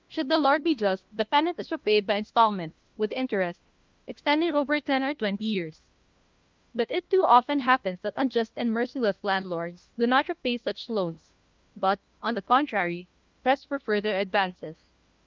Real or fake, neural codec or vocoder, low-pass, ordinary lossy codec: fake; codec, 16 kHz, 1 kbps, FunCodec, trained on LibriTTS, 50 frames a second; 7.2 kHz; Opus, 32 kbps